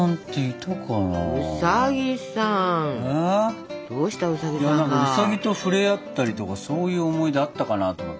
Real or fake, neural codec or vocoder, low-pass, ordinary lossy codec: real; none; none; none